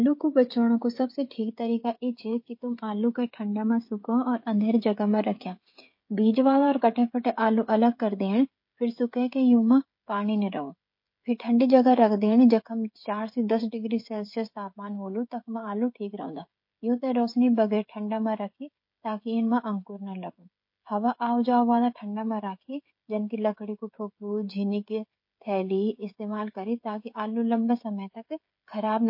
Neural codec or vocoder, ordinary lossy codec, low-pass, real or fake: codec, 16 kHz, 16 kbps, FreqCodec, smaller model; MP3, 32 kbps; 5.4 kHz; fake